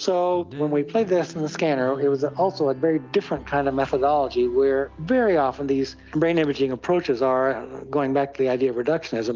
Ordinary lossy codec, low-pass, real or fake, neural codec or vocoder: Opus, 24 kbps; 7.2 kHz; real; none